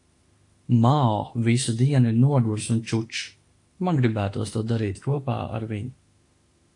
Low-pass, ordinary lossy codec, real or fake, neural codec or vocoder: 10.8 kHz; AAC, 48 kbps; fake; autoencoder, 48 kHz, 32 numbers a frame, DAC-VAE, trained on Japanese speech